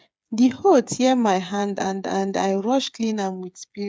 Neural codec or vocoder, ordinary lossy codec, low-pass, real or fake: codec, 16 kHz, 8 kbps, FreqCodec, smaller model; none; none; fake